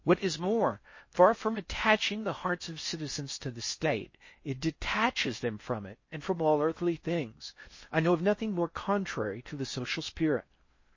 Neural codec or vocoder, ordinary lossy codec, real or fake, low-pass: codec, 16 kHz in and 24 kHz out, 0.6 kbps, FocalCodec, streaming, 4096 codes; MP3, 32 kbps; fake; 7.2 kHz